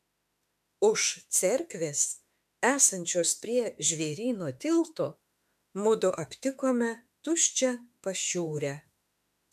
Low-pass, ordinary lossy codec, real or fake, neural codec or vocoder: 14.4 kHz; MP3, 96 kbps; fake; autoencoder, 48 kHz, 32 numbers a frame, DAC-VAE, trained on Japanese speech